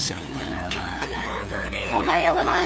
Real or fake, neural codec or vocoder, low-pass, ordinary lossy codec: fake; codec, 16 kHz, 2 kbps, FunCodec, trained on LibriTTS, 25 frames a second; none; none